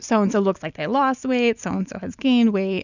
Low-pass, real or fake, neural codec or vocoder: 7.2 kHz; real; none